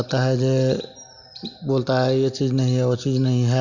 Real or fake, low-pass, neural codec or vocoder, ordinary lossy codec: real; 7.2 kHz; none; none